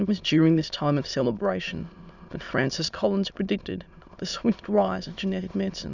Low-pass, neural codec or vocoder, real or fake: 7.2 kHz; autoencoder, 22.05 kHz, a latent of 192 numbers a frame, VITS, trained on many speakers; fake